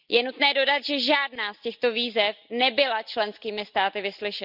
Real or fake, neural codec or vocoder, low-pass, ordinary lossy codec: real; none; 5.4 kHz; none